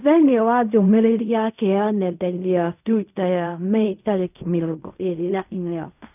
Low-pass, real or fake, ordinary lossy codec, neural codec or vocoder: 3.6 kHz; fake; none; codec, 16 kHz in and 24 kHz out, 0.4 kbps, LongCat-Audio-Codec, fine tuned four codebook decoder